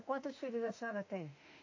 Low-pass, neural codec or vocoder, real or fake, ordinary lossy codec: 7.2 kHz; autoencoder, 48 kHz, 32 numbers a frame, DAC-VAE, trained on Japanese speech; fake; AAC, 48 kbps